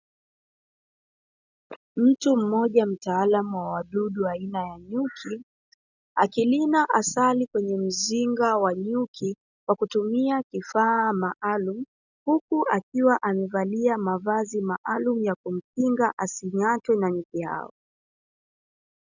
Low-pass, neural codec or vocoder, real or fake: 7.2 kHz; none; real